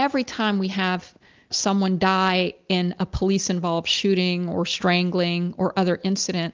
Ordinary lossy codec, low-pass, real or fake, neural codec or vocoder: Opus, 32 kbps; 7.2 kHz; real; none